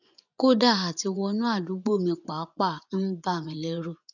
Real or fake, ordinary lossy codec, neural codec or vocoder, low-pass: fake; none; vocoder, 24 kHz, 100 mel bands, Vocos; 7.2 kHz